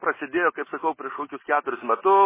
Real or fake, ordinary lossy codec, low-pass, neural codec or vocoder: real; MP3, 16 kbps; 3.6 kHz; none